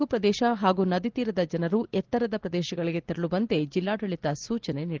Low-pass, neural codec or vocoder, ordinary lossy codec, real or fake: 7.2 kHz; none; Opus, 16 kbps; real